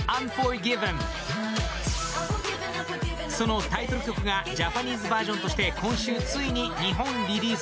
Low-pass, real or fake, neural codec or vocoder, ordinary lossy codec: none; real; none; none